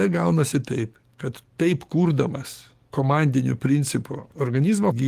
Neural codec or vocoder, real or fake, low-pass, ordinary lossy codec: codec, 44.1 kHz, 7.8 kbps, DAC; fake; 14.4 kHz; Opus, 24 kbps